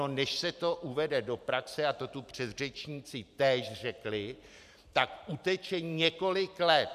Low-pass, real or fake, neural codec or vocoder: 14.4 kHz; fake; vocoder, 44.1 kHz, 128 mel bands every 512 samples, BigVGAN v2